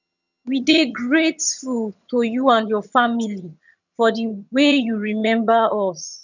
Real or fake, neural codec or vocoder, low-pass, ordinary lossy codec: fake; vocoder, 22.05 kHz, 80 mel bands, HiFi-GAN; 7.2 kHz; none